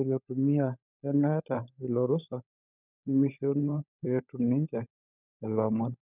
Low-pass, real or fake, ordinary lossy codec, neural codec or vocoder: 3.6 kHz; fake; none; codec, 16 kHz, 16 kbps, FunCodec, trained on LibriTTS, 50 frames a second